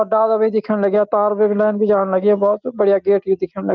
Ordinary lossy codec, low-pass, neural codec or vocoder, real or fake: Opus, 24 kbps; 7.2 kHz; none; real